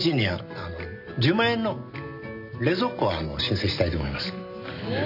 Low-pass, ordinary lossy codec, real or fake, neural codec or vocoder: 5.4 kHz; none; real; none